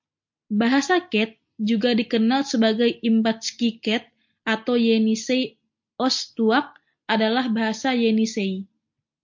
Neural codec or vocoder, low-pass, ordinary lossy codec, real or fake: none; 7.2 kHz; MP3, 48 kbps; real